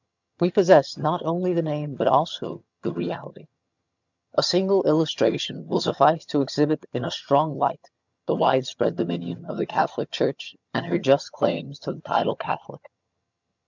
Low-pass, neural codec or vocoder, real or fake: 7.2 kHz; vocoder, 22.05 kHz, 80 mel bands, HiFi-GAN; fake